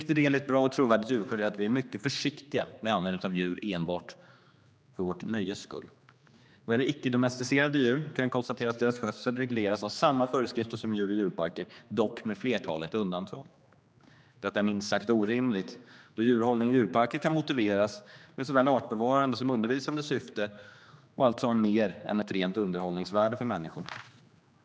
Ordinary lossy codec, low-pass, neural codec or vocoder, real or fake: none; none; codec, 16 kHz, 2 kbps, X-Codec, HuBERT features, trained on general audio; fake